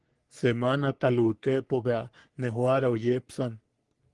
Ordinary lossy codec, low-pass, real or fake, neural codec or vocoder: Opus, 24 kbps; 10.8 kHz; fake; codec, 44.1 kHz, 3.4 kbps, Pupu-Codec